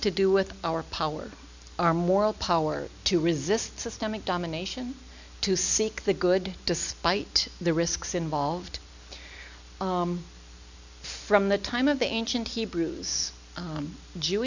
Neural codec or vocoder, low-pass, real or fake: none; 7.2 kHz; real